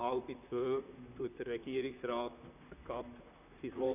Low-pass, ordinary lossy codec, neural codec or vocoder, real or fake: 3.6 kHz; none; vocoder, 44.1 kHz, 128 mel bands, Pupu-Vocoder; fake